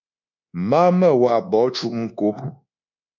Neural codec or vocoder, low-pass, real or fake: codec, 24 kHz, 1.2 kbps, DualCodec; 7.2 kHz; fake